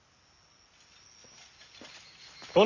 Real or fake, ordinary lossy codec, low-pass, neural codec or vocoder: real; none; 7.2 kHz; none